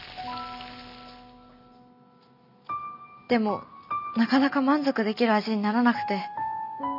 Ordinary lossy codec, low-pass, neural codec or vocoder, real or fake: none; 5.4 kHz; none; real